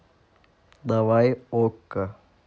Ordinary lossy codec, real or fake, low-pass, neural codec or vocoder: none; real; none; none